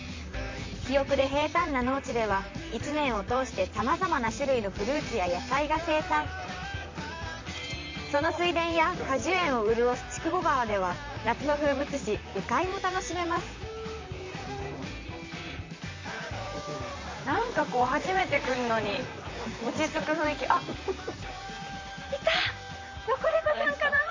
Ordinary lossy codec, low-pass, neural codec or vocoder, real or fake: MP3, 48 kbps; 7.2 kHz; vocoder, 44.1 kHz, 128 mel bands, Pupu-Vocoder; fake